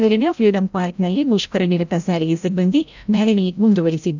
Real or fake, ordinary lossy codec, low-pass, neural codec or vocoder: fake; none; 7.2 kHz; codec, 16 kHz, 0.5 kbps, FreqCodec, larger model